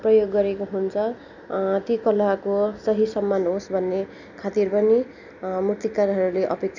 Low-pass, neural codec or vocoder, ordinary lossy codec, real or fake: 7.2 kHz; none; none; real